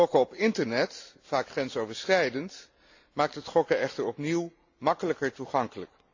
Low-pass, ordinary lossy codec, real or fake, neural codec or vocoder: 7.2 kHz; AAC, 48 kbps; real; none